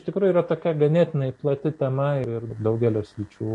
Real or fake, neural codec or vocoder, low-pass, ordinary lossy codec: real; none; 10.8 kHz; MP3, 48 kbps